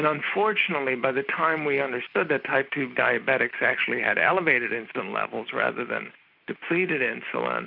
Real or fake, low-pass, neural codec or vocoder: real; 5.4 kHz; none